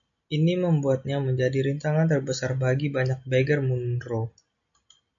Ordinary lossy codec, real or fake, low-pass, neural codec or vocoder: MP3, 48 kbps; real; 7.2 kHz; none